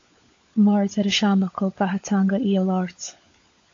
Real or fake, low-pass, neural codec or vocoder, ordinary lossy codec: fake; 7.2 kHz; codec, 16 kHz, 16 kbps, FunCodec, trained on LibriTTS, 50 frames a second; AAC, 48 kbps